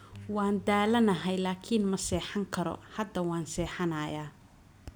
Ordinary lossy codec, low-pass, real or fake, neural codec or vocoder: none; none; real; none